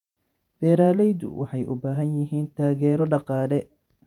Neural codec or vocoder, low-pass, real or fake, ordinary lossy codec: vocoder, 48 kHz, 128 mel bands, Vocos; 19.8 kHz; fake; none